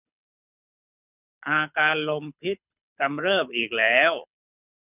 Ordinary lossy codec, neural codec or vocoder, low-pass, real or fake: none; codec, 24 kHz, 6 kbps, HILCodec; 3.6 kHz; fake